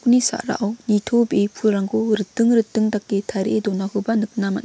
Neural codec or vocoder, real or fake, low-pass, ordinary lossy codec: none; real; none; none